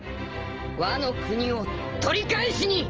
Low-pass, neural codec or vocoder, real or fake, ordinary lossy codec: 7.2 kHz; none; real; Opus, 24 kbps